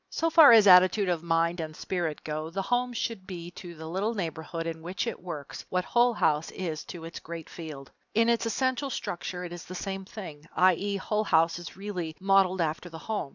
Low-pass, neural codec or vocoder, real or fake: 7.2 kHz; none; real